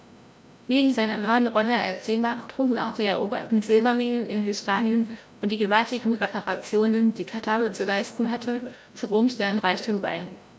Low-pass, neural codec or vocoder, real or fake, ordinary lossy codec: none; codec, 16 kHz, 0.5 kbps, FreqCodec, larger model; fake; none